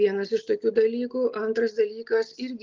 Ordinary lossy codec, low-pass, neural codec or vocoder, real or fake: Opus, 24 kbps; 7.2 kHz; none; real